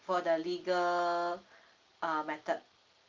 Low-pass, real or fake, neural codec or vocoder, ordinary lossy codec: 7.2 kHz; real; none; Opus, 32 kbps